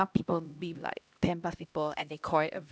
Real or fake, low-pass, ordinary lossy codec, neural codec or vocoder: fake; none; none; codec, 16 kHz, 1 kbps, X-Codec, HuBERT features, trained on LibriSpeech